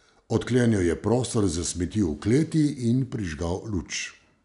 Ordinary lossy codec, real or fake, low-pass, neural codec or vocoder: none; real; 10.8 kHz; none